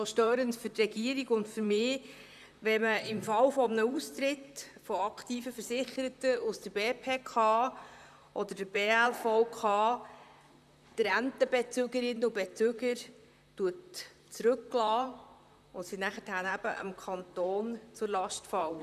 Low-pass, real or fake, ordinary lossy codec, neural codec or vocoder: 14.4 kHz; fake; none; vocoder, 44.1 kHz, 128 mel bands, Pupu-Vocoder